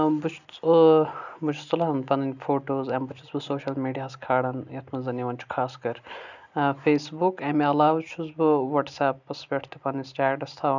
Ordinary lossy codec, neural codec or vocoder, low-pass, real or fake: none; none; 7.2 kHz; real